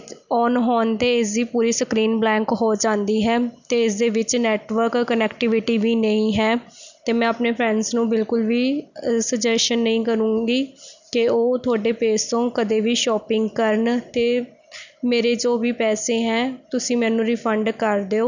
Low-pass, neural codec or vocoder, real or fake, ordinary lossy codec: 7.2 kHz; none; real; none